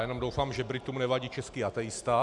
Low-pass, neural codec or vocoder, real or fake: 10.8 kHz; none; real